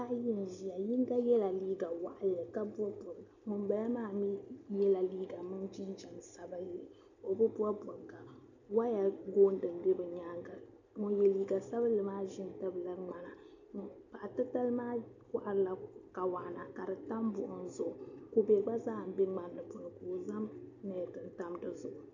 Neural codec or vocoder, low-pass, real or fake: none; 7.2 kHz; real